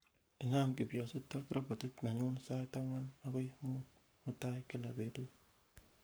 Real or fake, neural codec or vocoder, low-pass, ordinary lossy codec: fake; codec, 44.1 kHz, 7.8 kbps, Pupu-Codec; none; none